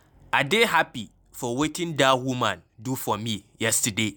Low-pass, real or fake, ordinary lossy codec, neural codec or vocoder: none; real; none; none